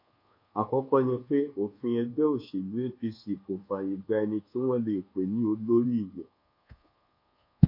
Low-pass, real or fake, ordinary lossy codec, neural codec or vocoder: 5.4 kHz; fake; MP3, 32 kbps; codec, 24 kHz, 1.2 kbps, DualCodec